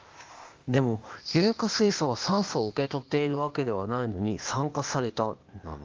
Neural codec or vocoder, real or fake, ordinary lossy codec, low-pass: codec, 16 kHz, 0.8 kbps, ZipCodec; fake; Opus, 32 kbps; 7.2 kHz